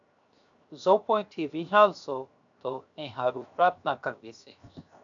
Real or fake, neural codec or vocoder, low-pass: fake; codec, 16 kHz, 0.7 kbps, FocalCodec; 7.2 kHz